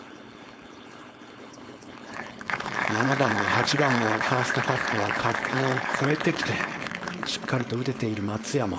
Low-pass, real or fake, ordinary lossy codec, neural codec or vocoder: none; fake; none; codec, 16 kHz, 4.8 kbps, FACodec